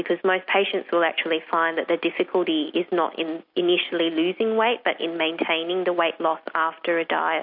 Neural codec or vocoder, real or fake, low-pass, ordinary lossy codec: none; real; 5.4 kHz; MP3, 48 kbps